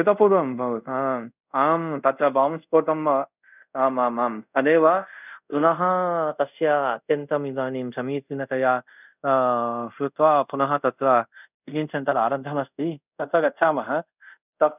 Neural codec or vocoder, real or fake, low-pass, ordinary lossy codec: codec, 24 kHz, 0.5 kbps, DualCodec; fake; 3.6 kHz; none